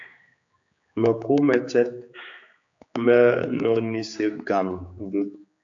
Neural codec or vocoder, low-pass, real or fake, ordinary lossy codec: codec, 16 kHz, 4 kbps, X-Codec, HuBERT features, trained on general audio; 7.2 kHz; fake; AAC, 64 kbps